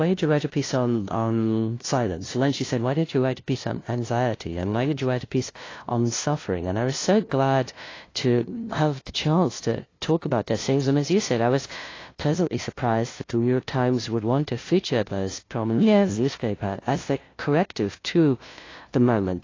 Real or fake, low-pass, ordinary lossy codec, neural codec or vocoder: fake; 7.2 kHz; AAC, 32 kbps; codec, 16 kHz, 0.5 kbps, FunCodec, trained on LibriTTS, 25 frames a second